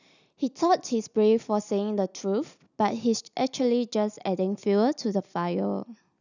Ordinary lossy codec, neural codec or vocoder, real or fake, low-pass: none; none; real; 7.2 kHz